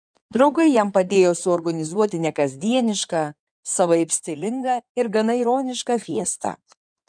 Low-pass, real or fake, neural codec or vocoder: 9.9 kHz; fake; codec, 16 kHz in and 24 kHz out, 2.2 kbps, FireRedTTS-2 codec